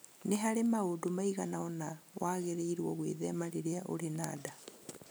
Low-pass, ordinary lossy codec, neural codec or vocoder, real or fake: none; none; none; real